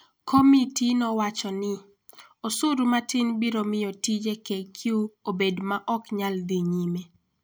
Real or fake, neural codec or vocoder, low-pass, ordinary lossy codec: real; none; none; none